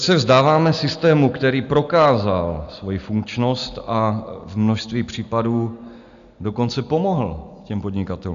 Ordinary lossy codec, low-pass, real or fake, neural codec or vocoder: AAC, 96 kbps; 7.2 kHz; real; none